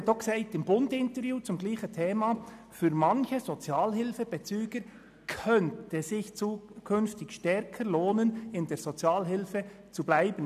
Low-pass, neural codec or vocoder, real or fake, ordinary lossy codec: 14.4 kHz; none; real; none